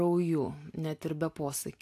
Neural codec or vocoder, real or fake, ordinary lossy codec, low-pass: none; real; AAC, 64 kbps; 14.4 kHz